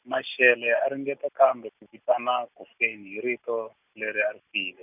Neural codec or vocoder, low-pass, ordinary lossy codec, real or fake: none; 3.6 kHz; none; real